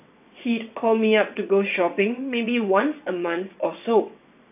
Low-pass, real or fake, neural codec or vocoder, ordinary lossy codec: 3.6 kHz; fake; vocoder, 22.05 kHz, 80 mel bands, WaveNeXt; none